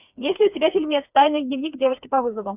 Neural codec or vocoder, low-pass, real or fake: codec, 16 kHz, 4 kbps, FreqCodec, smaller model; 3.6 kHz; fake